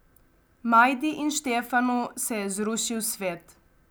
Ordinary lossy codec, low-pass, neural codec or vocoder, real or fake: none; none; none; real